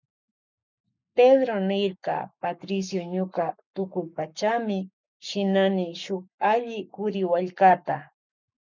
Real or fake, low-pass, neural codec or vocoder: fake; 7.2 kHz; codec, 44.1 kHz, 7.8 kbps, Pupu-Codec